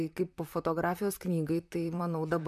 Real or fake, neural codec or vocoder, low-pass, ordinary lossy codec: fake; vocoder, 44.1 kHz, 128 mel bands, Pupu-Vocoder; 14.4 kHz; MP3, 96 kbps